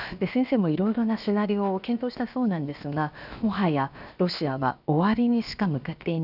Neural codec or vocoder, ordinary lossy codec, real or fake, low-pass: codec, 16 kHz, about 1 kbps, DyCAST, with the encoder's durations; none; fake; 5.4 kHz